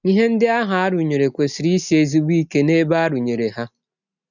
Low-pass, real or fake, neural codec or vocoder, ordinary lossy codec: 7.2 kHz; real; none; none